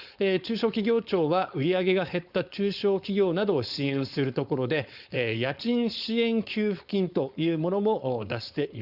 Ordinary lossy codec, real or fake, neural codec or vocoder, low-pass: Opus, 64 kbps; fake; codec, 16 kHz, 4.8 kbps, FACodec; 5.4 kHz